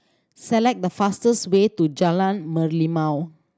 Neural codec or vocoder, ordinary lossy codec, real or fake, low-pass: none; none; real; none